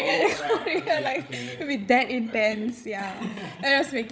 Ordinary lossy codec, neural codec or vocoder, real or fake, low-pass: none; codec, 16 kHz, 16 kbps, FreqCodec, larger model; fake; none